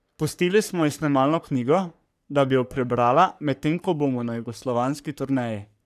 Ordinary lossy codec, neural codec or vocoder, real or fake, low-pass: none; codec, 44.1 kHz, 3.4 kbps, Pupu-Codec; fake; 14.4 kHz